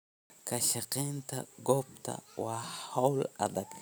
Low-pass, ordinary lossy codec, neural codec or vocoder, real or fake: none; none; none; real